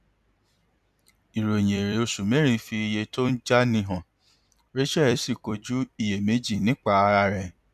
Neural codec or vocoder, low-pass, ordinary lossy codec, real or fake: vocoder, 44.1 kHz, 128 mel bands every 256 samples, BigVGAN v2; 14.4 kHz; none; fake